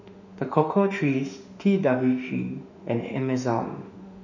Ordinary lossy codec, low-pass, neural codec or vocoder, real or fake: none; 7.2 kHz; autoencoder, 48 kHz, 32 numbers a frame, DAC-VAE, trained on Japanese speech; fake